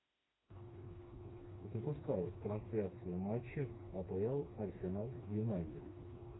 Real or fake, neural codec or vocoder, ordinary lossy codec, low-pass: fake; codec, 16 kHz, 2 kbps, FreqCodec, smaller model; AAC, 16 kbps; 7.2 kHz